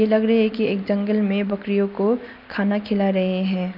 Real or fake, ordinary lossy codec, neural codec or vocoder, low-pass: real; none; none; 5.4 kHz